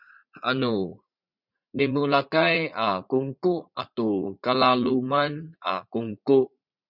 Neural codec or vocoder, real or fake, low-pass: vocoder, 44.1 kHz, 80 mel bands, Vocos; fake; 5.4 kHz